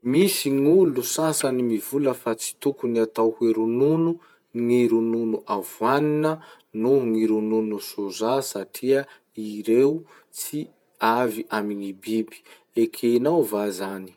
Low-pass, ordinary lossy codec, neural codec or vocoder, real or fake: 19.8 kHz; none; vocoder, 48 kHz, 128 mel bands, Vocos; fake